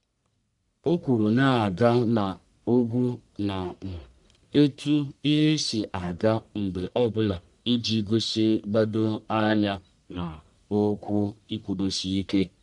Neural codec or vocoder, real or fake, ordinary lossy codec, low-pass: codec, 44.1 kHz, 1.7 kbps, Pupu-Codec; fake; none; 10.8 kHz